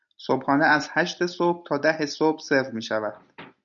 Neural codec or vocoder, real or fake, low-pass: none; real; 7.2 kHz